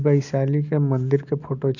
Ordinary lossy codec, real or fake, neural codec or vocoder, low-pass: none; real; none; 7.2 kHz